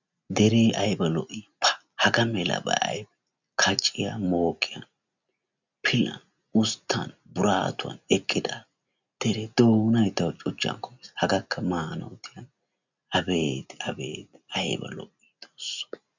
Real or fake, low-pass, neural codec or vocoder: real; 7.2 kHz; none